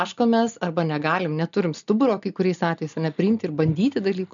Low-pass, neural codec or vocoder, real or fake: 7.2 kHz; none; real